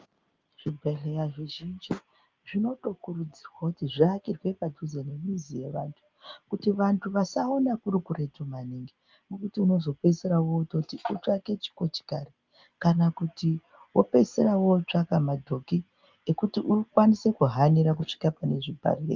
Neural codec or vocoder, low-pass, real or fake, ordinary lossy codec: none; 7.2 kHz; real; Opus, 32 kbps